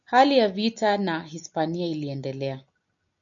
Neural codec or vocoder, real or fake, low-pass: none; real; 7.2 kHz